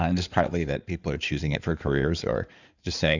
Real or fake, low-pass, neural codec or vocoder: fake; 7.2 kHz; codec, 16 kHz in and 24 kHz out, 2.2 kbps, FireRedTTS-2 codec